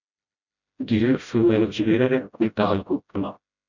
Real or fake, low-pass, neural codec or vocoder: fake; 7.2 kHz; codec, 16 kHz, 0.5 kbps, FreqCodec, smaller model